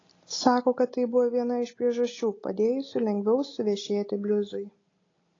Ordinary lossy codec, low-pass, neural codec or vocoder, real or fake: AAC, 32 kbps; 7.2 kHz; none; real